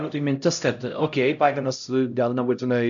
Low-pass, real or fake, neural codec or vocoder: 7.2 kHz; fake; codec, 16 kHz, 0.5 kbps, X-Codec, HuBERT features, trained on LibriSpeech